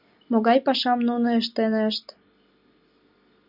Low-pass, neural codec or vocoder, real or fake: 5.4 kHz; none; real